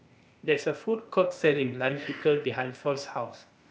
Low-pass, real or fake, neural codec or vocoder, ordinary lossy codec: none; fake; codec, 16 kHz, 0.8 kbps, ZipCodec; none